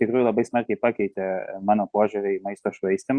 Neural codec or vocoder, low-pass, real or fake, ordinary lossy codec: none; 9.9 kHz; real; Opus, 64 kbps